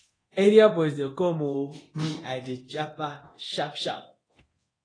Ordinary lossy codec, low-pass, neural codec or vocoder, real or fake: AAC, 32 kbps; 9.9 kHz; codec, 24 kHz, 0.9 kbps, DualCodec; fake